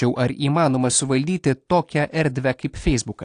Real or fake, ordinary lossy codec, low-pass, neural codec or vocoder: real; AAC, 48 kbps; 9.9 kHz; none